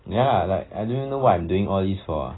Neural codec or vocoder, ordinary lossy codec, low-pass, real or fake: none; AAC, 16 kbps; 7.2 kHz; real